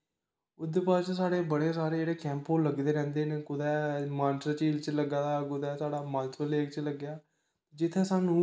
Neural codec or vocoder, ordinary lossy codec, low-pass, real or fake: none; none; none; real